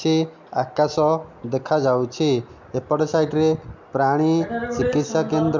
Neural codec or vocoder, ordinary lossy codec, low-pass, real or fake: none; MP3, 64 kbps; 7.2 kHz; real